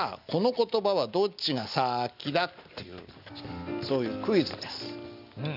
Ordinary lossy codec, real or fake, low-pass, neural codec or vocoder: none; real; 5.4 kHz; none